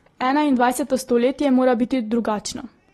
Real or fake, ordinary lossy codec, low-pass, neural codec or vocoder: real; AAC, 32 kbps; 19.8 kHz; none